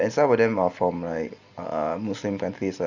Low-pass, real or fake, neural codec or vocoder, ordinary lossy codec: 7.2 kHz; real; none; Opus, 64 kbps